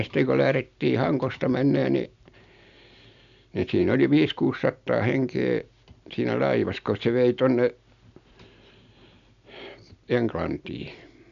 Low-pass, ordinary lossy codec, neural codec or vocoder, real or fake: 7.2 kHz; MP3, 96 kbps; none; real